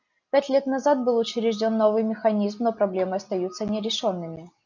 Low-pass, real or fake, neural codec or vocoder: 7.2 kHz; real; none